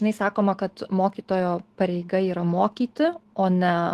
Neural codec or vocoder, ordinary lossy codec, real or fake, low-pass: autoencoder, 48 kHz, 128 numbers a frame, DAC-VAE, trained on Japanese speech; Opus, 16 kbps; fake; 14.4 kHz